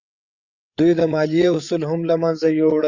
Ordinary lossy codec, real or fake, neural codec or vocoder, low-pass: Opus, 64 kbps; fake; codec, 16 kHz, 8 kbps, FreqCodec, larger model; 7.2 kHz